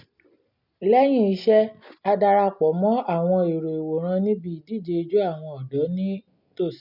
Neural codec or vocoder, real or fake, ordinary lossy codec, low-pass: none; real; none; 5.4 kHz